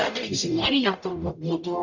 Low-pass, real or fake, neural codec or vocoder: 7.2 kHz; fake; codec, 44.1 kHz, 0.9 kbps, DAC